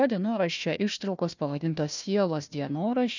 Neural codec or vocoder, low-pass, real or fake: codec, 16 kHz, 1 kbps, FunCodec, trained on Chinese and English, 50 frames a second; 7.2 kHz; fake